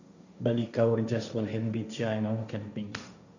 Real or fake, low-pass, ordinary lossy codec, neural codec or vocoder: fake; 7.2 kHz; none; codec, 16 kHz, 1.1 kbps, Voila-Tokenizer